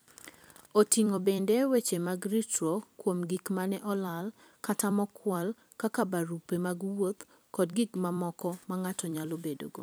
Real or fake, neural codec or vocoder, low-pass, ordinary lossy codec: fake; vocoder, 44.1 kHz, 128 mel bands every 256 samples, BigVGAN v2; none; none